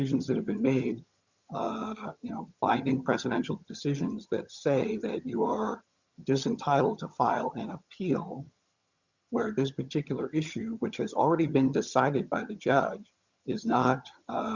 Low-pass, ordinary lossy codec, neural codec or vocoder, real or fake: 7.2 kHz; Opus, 64 kbps; vocoder, 22.05 kHz, 80 mel bands, HiFi-GAN; fake